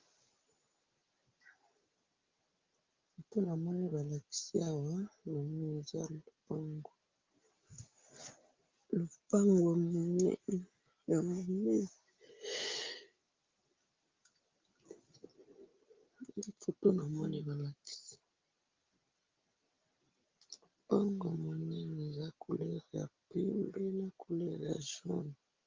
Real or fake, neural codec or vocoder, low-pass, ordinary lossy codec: real; none; 7.2 kHz; Opus, 32 kbps